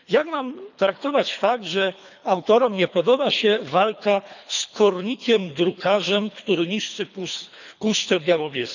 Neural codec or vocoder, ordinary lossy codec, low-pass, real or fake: codec, 24 kHz, 3 kbps, HILCodec; none; 7.2 kHz; fake